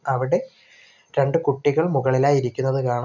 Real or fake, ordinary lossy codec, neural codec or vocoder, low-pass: real; none; none; 7.2 kHz